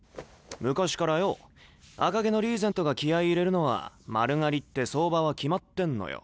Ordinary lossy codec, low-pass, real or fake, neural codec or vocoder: none; none; real; none